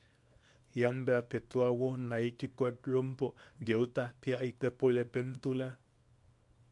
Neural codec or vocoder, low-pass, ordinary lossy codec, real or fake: codec, 24 kHz, 0.9 kbps, WavTokenizer, small release; 10.8 kHz; MP3, 64 kbps; fake